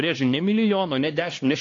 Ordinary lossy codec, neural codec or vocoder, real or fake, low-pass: AAC, 32 kbps; codec, 16 kHz, 2 kbps, X-Codec, HuBERT features, trained on LibriSpeech; fake; 7.2 kHz